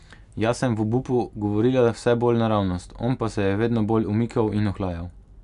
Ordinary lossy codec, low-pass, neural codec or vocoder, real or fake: none; 10.8 kHz; none; real